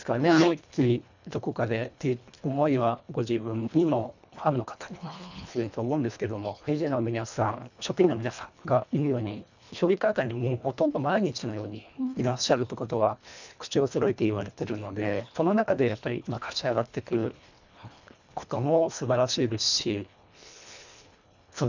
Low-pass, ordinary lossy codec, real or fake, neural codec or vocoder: 7.2 kHz; none; fake; codec, 24 kHz, 1.5 kbps, HILCodec